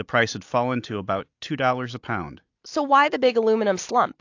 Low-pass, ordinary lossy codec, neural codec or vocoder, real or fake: 7.2 kHz; AAC, 48 kbps; none; real